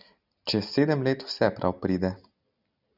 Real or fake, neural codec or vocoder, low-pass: real; none; 5.4 kHz